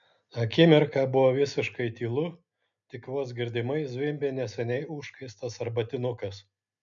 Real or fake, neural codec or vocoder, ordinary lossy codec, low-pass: real; none; MP3, 96 kbps; 7.2 kHz